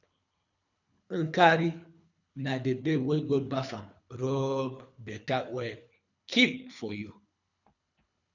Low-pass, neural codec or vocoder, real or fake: 7.2 kHz; codec, 24 kHz, 3 kbps, HILCodec; fake